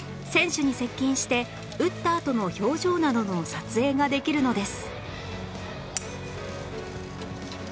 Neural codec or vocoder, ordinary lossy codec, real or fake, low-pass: none; none; real; none